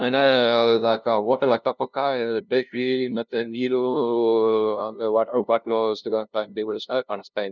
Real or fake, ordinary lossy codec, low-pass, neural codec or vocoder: fake; none; 7.2 kHz; codec, 16 kHz, 0.5 kbps, FunCodec, trained on LibriTTS, 25 frames a second